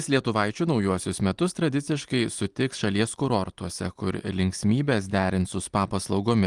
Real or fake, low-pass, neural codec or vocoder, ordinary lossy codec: real; 10.8 kHz; none; Opus, 24 kbps